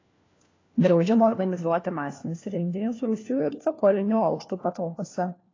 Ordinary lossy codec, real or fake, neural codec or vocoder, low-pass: AAC, 32 kbps; fake; codec, 16 kHz, 1 kbps, FunCodec, trained on LibriTTS, 50 frames a second; 7.2 kHz